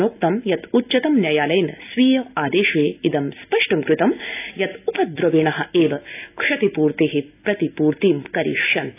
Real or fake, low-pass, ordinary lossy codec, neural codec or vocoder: real; 3.6 kHz; AAC, 24 kbps; none